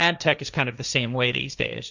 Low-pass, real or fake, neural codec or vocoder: 7.2 kHz; fake; codec, 16 kHz, 1.1 kbps, Voila-Tokenizer